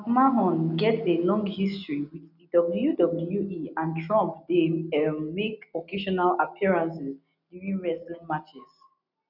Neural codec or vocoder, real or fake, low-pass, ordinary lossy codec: none; real; 5.4 kHz; none